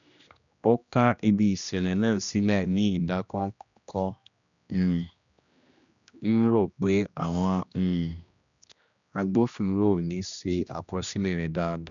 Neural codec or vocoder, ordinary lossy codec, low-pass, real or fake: codec, 16 kHz, 1 kbps, X-Codec, HuBERT features, trained on general audio; none; 7.2 kHz; fake